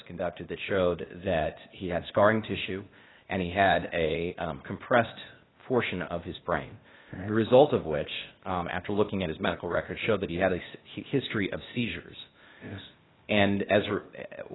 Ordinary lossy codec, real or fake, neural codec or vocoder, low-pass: AAC, 16 kbps; fake; codec, 16 kHz, 0.8 kbps, ZipCodec; 7.2 kHz